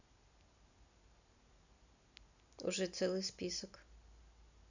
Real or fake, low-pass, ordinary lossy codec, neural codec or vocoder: real; 7.2 kHz; none; none